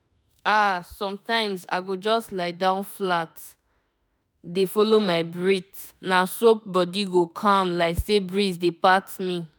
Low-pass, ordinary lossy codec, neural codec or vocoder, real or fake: none; none; autoencoder, 48 kHz, 32 numbers a frame, DAC-VAE, trained on Japanese speech; fake